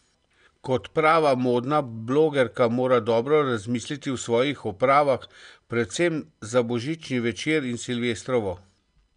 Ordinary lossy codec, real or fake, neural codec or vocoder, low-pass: none; real; none; 9.9 kHz